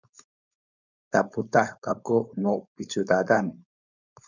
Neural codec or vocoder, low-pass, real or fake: codec, 16 kHz, 4.8 kbps, FACodec; 7.2 kHz; fake